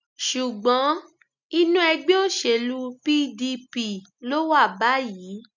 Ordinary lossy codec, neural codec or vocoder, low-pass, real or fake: none; none; 7.2 kHz; real